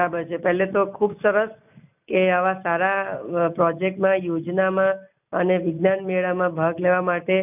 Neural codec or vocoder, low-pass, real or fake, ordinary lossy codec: none; 3.6 kHz; real; none